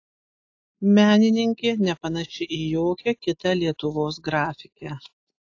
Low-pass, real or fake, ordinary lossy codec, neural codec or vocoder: 7.2 kHz; real; AAC, 48 kbps; none